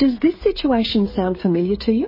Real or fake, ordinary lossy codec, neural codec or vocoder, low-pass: fake; MP3, 24 kbps; codec, 16 kHz, 8 kbps, FreqCodec, smaller model; 5.4 kHz